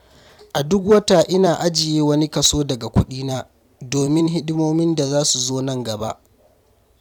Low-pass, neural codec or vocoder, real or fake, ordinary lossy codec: 19.8 kHz; vocoder, 44.1 kHz, 128 mel bands every 256 samples, BigVGAN v2; fake; none